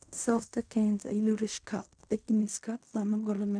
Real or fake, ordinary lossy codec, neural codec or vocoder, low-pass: fake; none; codec, 16 kHz in and 24 kHz out, 0.4 kbps, LongCat-Audio-Codec, fine tuned four codebook decoder; 9.9 kHz